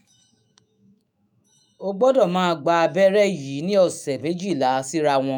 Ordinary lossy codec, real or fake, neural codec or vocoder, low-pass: none; fake; autoencoder, 48 kHz, 128 numbers a frame, DAC-VAE, trained on Japanese speech; none